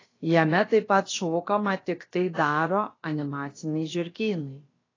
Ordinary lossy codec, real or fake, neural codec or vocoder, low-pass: AAC, 32 kbps; fake; codec, 16 kHz, about 1 kbps, DyCAST, with the encoder's durations; 7.2 kHz